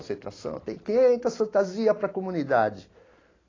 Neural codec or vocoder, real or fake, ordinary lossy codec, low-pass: none; real; AAC, 32 kbps; 7.2 kHz